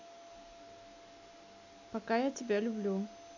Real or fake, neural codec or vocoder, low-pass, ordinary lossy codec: real; none; 7.2 kHz; AAC, 48 kbps